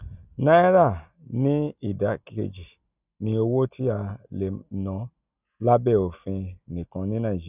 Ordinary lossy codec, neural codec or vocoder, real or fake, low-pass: none; none; real; 3.6 kHz